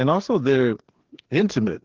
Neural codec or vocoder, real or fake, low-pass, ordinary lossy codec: codec, 16 kHz, 2 kbps, FreqCodec, larger model; fake; 7.2 kHz; Opus, 16 kbps